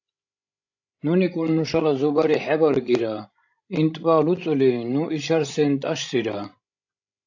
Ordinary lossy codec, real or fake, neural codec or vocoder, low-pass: AAC, 48 kbps; fake; codec, 16 kHz, 16 kbps, FreqCodec, larger model; 7.2 kHz